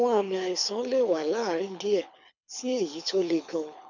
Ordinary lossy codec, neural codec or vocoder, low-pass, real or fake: none; codec, 24 kHz, 6 kbps, HILCodec; 7.2 kHz; fake